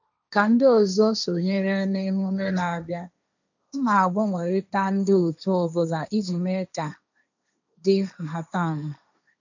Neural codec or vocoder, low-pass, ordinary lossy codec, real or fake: codec, 16 kHz, 1.1 kbps, Voila-Tokenizer; 7.2 kHz; none; fake